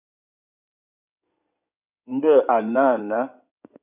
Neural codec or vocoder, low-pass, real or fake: codec, 16 kHz in and 24 kHz out, 2.2 kbps, FireRedTTS-2 codec; 3.6 kHz; fake